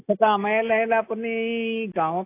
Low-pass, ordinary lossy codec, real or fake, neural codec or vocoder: 3.6 kHz; AAC, 24 kbps; real; none